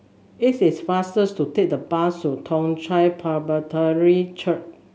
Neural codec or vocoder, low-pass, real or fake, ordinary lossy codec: none; none; real; none